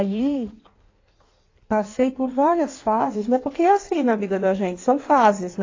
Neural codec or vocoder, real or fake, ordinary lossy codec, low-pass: codec, 16 kHz in and 24 kHz out, 1.1 kbps, FireRedTTS-2 codec; fake; AAC, 32 kbps; 7.2 kHz